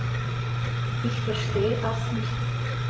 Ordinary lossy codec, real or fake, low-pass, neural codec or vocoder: none; fake; none; codec, 16 kHz, 8 kbps, FreqCodec, larger model